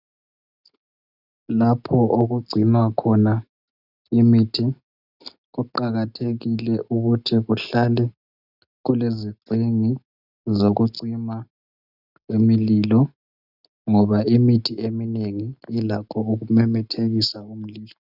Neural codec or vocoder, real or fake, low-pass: none; real; 5.4 kHz